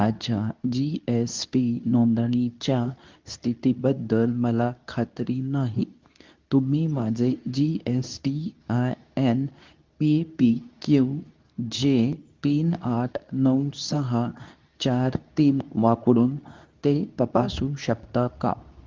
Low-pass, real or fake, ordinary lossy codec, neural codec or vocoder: 7.2 kHz; fake; Opus, 24 kbps; codec, 24 kHz, 0.9 kbps, WavTokenizer, medium speech release version 2